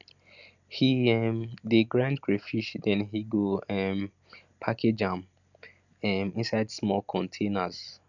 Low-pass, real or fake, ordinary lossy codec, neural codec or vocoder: 7.2 kHz; real; none; none